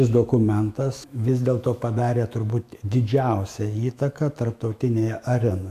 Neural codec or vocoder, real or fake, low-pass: autoencoder, 48 kHz, 128 numbers a frame, DAC-VAE, trained on Japanese speech; fake; 14.4 kHz